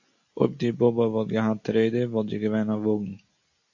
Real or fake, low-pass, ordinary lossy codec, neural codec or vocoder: real; 7.2 kHz; AAC, 48 kbps; none